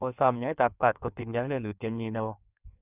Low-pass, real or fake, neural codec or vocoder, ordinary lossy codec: 3.6 kHz; fake; codec, 16 kHz in and 24 kHz out, 1.1 kbps, FireRedTTS-2 codec; none